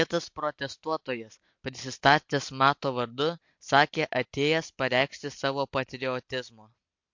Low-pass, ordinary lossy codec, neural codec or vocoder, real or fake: 7.2 kHz; MP3, 48 kbps; none; real